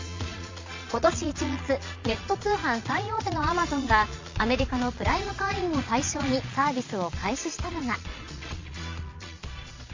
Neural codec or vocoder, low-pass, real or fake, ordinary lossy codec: vocoder, 22.05 kHz, 80 mel bands, Vocos; 7.2 kHz; fake; MP3, 48 kbps